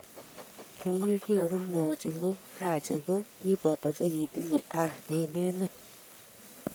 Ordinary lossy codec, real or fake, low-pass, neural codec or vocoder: none; fake; none; codec, 44.1 kHz, 1.7 kbps, Pupu-Codec